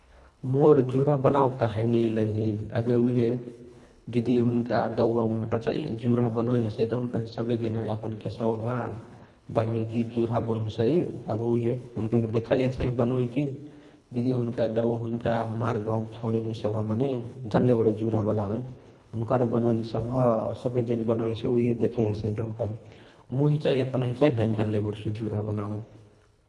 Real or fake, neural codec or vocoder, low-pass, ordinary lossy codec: fake; codec, 24 kHz, 1.5 kbps, HILCodec; none; none